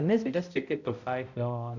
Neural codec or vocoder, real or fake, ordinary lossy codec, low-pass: codec, 16 kHz, 0.5 kbps, X-Codec, HuBERT features, trained on balanced general audio; fake; none; 7.2 kHz